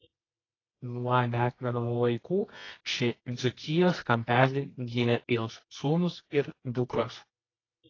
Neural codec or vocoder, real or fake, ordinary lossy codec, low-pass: codec, 24 kHz, 0.9 kbps, WavTokenizer, medium music audio release; fake; AAC, 32 kbps; 7.2 kHz